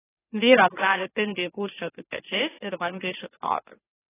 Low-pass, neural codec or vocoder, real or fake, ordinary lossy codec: 3.6 kHz; autoencoder, 44.1 kHz, a latent of 192 numbers a frame, MeloTTS; fake; AAC, 16 kbps